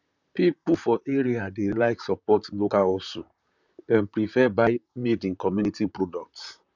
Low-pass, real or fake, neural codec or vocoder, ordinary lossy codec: 7.2 kHz; fake; vocoder, 44.1 kHz, 128 mel bands, Pupu-Vocoder; none